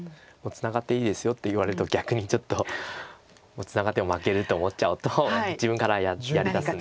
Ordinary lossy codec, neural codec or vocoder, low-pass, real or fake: none; none; none; real